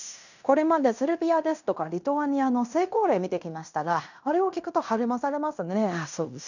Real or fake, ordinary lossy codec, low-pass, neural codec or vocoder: fake; none; 7.2 kHz; codec, 16 kHz in and 24 kHz out, 0.9 kbps, LongCat-Audio-Codec, fine tuned four codebook decoder